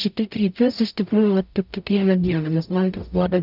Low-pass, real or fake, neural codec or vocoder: 5.4 kHz; fake; codec, 44.1 kHz, 0.9 kbps, DAC